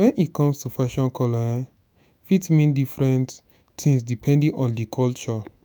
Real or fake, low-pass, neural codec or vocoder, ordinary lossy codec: fake; none; autoencoder, 48 kHz, 128 numbers a frame, DAC-VAE, trained on Japanese speech; none